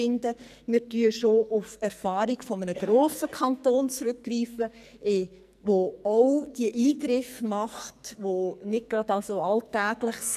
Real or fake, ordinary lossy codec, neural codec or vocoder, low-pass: fake; none; codec, 44.1 kHz, 2.6 kbps, SNAC; 14.4 kHz